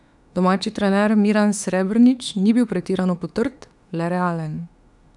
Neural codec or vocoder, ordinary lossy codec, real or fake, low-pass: autoencoder, 48 kHz, 32 numbers a frame, DAC-VAE, trained on Japanese speech; none; fake; 10.8 kHz